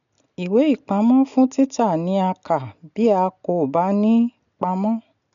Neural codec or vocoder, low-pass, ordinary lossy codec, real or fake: none; 7.2 kHz; none; real